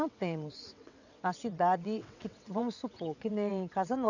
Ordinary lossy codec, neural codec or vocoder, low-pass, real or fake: none; vocoder, 22.05 kHz, 80 mel bands, WaveNeXt; 7.2 kHz; fake